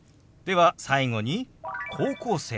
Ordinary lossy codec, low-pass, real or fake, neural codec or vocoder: none; none; real; none